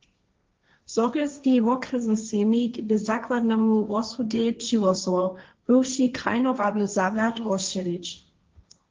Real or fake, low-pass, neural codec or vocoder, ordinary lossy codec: fake; 7.2 kHz; codec, 16 kHz, 1.1 kbps, Voila-Tokenizer; Opus, 24 kbps